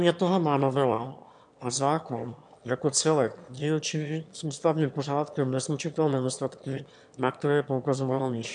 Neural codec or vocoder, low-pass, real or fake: autoencoder, 22.05 kHz, a latent of 192 numbers a frame, VITS, trained on one speaker; 9.9 kHz; fake